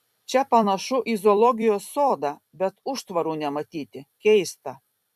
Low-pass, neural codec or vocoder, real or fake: 14.4 kHz; vocoder, 44.1 kHz, 128 mel bands every 256 samples, BigVGAN v2; fake